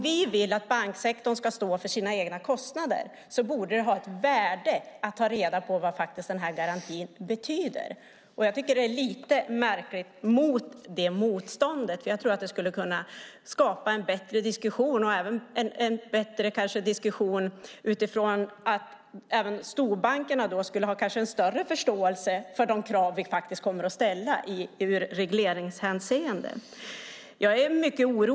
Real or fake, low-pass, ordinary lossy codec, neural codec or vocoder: real; none; none; none